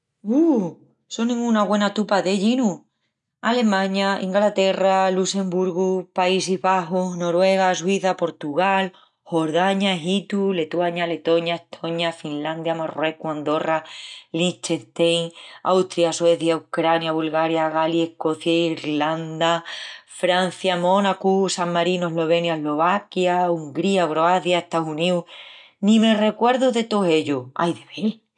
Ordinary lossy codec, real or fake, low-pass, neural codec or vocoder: none; real; 10.8 kHz; none